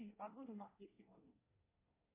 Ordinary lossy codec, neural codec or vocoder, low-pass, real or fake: MP3, 32 kbps; codec, 24 kHz, 1 kbps, SNAC; 3.6 kHz; fake